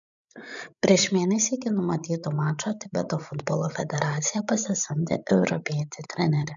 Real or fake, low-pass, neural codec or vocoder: fake; 7.2 kHz; codec, 16 kHz, 16 kbps, FreqCodec, larger model